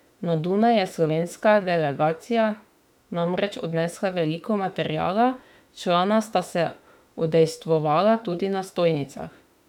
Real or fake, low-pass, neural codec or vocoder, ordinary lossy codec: fake; 19.8 kHz; autoencoder, 48 kHz, 32 numbers a frame, DAC-VAE, trained on Japanese speech; none